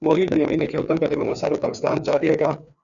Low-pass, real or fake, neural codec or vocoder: 7.2 kHz; fake; codec, 16 kHz, 2 kbps, FreqCodec, larger model